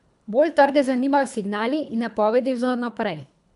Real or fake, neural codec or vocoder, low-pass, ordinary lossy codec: fake; codec, 24 kHz, 3 kbps, HILCodec; 10.8 kHz; none